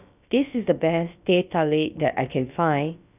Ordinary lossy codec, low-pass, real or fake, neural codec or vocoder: none; 3.6 kHz; fake; codec, 16 kHz, about 1 kbps, DyCAST, with the encoder's durations